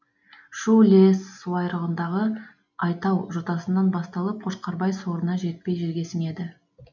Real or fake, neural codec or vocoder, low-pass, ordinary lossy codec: real; none; 7.2 kHz; none